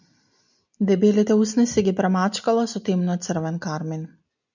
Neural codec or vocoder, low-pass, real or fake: none; 7.2 kHz; real